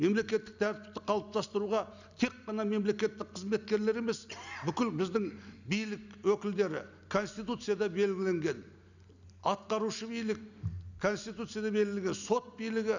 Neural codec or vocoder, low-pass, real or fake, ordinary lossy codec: none; 7.2 kHz; real; none